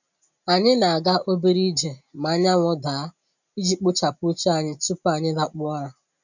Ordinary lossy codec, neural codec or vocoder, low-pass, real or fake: none; none; 7.2 kHz; real